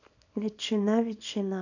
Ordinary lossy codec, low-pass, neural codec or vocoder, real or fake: none; 7.2 kHz; codec, 24 kHz, 0.9 kbps, WavTokenizer, small release; fake